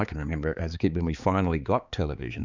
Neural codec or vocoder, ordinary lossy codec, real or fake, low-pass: codec, 16 kHz, 4 kbps, X-Codec, HuBERT features, trained on balanced general audio; Opus, 64 kbps; fake; 7.2 kHz